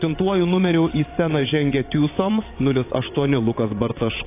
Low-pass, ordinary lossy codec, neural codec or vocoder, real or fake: 3.6 kHz; AAC, 24 kbps; vocoder, 22.05 kHz, 80 mel bands, WaveNeXt; fake